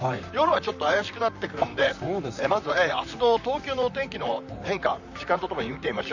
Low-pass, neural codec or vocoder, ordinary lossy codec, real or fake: 7.2 kHz; vocoder, 44.1 kHz, 128 mel bands, Pupu-Vocoder; none; fake